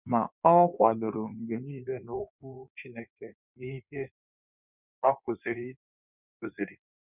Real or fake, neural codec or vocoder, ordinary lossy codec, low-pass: fake; codec, 16 kHz in and 24 kHz out, 1.1 kbps, FireRedTTS-2 codec; none; 3.6 kHz